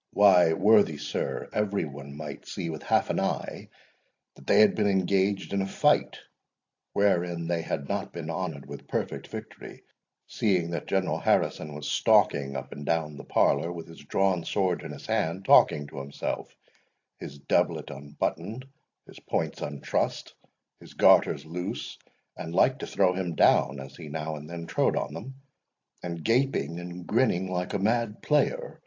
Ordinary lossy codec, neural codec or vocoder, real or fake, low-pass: AAC, 48 kbps; none; real; 7.2 kHz